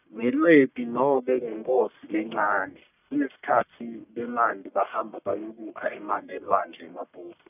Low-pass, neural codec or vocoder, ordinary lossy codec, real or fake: 3.6 kHz; codec, 44.1 kHz, 1.7 kbps, Pupu-Codec; none; fake